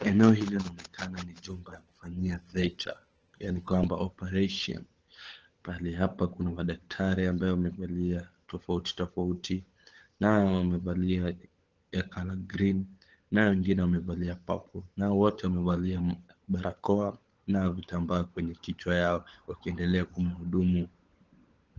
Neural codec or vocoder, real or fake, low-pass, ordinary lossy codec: codec, 16 kHz, 16 kbps, FunCodec, trained on LibriTTS, 50 frames a second; fake; 7.2 kHz; Opus, 16 kbps